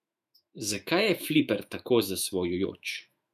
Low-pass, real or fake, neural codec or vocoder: 14.4 kHz; fake; autoencoder, 48 kHz, 128 numbers a frame, DAC-VAE, trained on Japanese speech